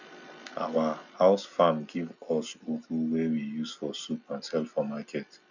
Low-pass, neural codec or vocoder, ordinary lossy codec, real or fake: 7.2 kHz; none; none; real